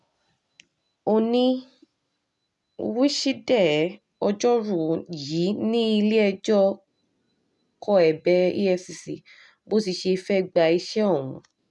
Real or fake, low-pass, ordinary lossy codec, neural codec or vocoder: real; 10.8 kHz; none; none